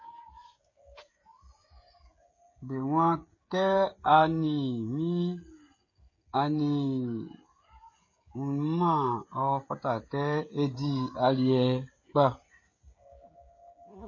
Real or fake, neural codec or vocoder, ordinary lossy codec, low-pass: fake; codec, 16 kHz, 16 kbps, FreqCodec, smaller model; MP3, 32 kbps; 7.2 kHz